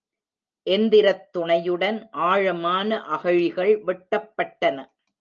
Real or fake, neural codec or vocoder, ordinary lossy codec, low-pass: real; none; Opus, 24 kbps; 7.2 kHz